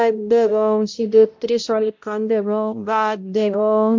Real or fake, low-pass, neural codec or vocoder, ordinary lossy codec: fake; 7.2 kHz; codec, 16 kHz, 0.5 kbps, X-Codec, HuBERT features, trained on balanced general audio; MP3, 48 kbps